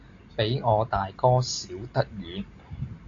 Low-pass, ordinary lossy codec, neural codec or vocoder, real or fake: 7.2 kHz; AAC, 48 kbps; none; real